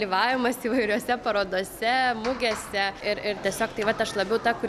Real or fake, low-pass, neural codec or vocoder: real; 14.4 kHz; none